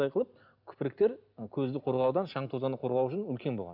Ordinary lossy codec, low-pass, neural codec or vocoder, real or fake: none; 5.4 kHz; none; real